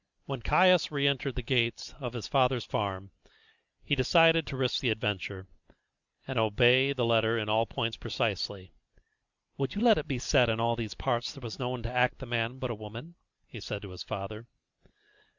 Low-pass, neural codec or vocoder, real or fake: 7.2 kHz; none; real